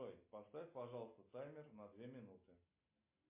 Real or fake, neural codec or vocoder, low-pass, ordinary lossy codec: real; none; 3.6 kHz; MP3, 24 kbps